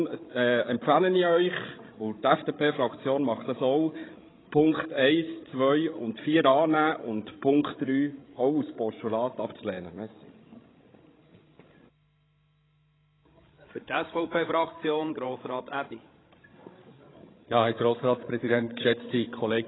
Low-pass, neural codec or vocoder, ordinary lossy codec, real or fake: 7.2 kHz; codec, 16 kHz, 16 kbps, FreqCodec, larger model; AAC, 16 kbps; fake